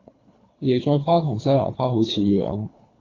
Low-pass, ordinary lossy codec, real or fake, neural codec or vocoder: 7.2 kHz; AAC, 32 kbps; fake; codec, 24 kHz, 3 kbps, HILCodec